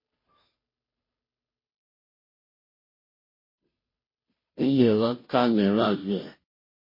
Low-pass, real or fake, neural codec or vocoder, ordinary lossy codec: 5.4 kHz; fake; codec, 16 kHz, 0.5 kbps, FunCodec, trained on Chinese and English, 25 frames a second; MP3, 24 kbps